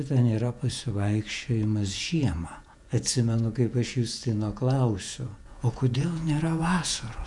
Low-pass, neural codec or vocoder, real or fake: 10.8 kHz; vocoder, 48 kHz, 128 mel bands, Vocos; fake